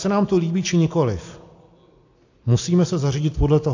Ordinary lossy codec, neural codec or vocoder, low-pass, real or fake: AAC, 48 kbps; none; 7.2 kHz; real